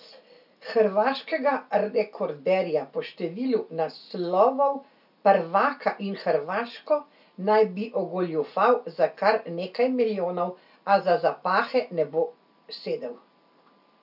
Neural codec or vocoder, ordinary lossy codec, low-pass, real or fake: none; none; 5.4 kHz; real